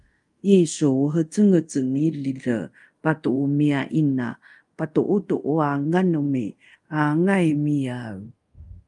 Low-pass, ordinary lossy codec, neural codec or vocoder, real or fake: 10.8 kHz; Opus, 32 kbps; codec, 24 kHz, 0.5 kbps, DualCodec; fake